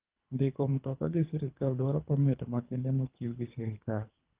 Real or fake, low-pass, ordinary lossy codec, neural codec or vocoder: fake; 3.6 kHz; Opus, 32 kbps; codec, 24 kHz, 3 kbps, HILCodec